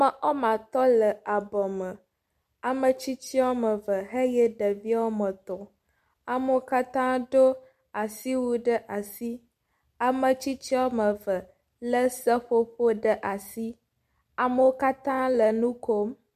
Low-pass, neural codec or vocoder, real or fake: 14.4 kHz; none; real